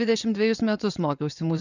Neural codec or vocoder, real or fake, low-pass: vocoder, 24 kHz, 100 mel bands, Vocos; fake; 7.2 kHz